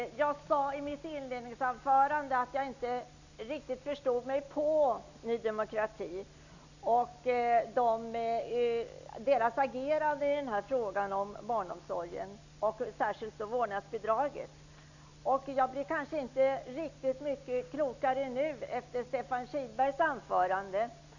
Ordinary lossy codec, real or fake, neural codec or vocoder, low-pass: none; real; none; 7.2 kHz